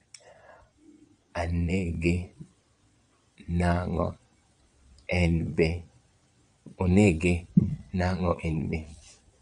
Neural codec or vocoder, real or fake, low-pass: vocoder, 22.05 kHz, 80 mel bands, Vocos; fake; 9.9 kHz